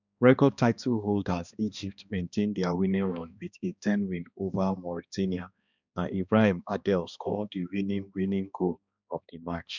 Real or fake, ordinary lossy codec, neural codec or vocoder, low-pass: fake; none; codec, 16 kHz, 2 kbps, X-Codec, HuBERT features, trained on balanced general audio; 7.2 kHz